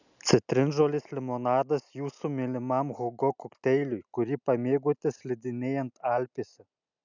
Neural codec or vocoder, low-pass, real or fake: none; 7.2 kHz; real